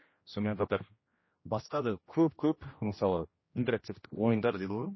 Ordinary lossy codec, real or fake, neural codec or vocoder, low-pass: MP3, 24 kbps; fake; codec, 16 kHz, 1 kbps, X-Codec, HuBERT features, trained on general audio; 7.2 kHz